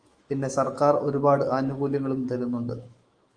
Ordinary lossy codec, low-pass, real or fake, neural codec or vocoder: Opus, 32 kbps; 9.9 kHz; fake; autoencoder, 48 kHz, 128 numbers a frame, DAC-VAE, trained on Japanese speech